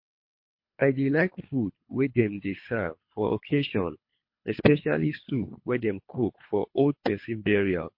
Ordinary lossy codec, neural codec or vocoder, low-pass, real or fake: MP3, 32 kbps; codec, 24 kHz, 3 kbps, HILCodec; 5.4 kHz; fake